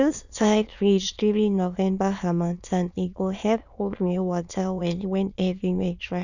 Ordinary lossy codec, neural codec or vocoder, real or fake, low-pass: none; autoencoder, 22.05 kHz, a latent of 192 numbers a frame, VITS, trained on many speakers; fake; 7.2 kHz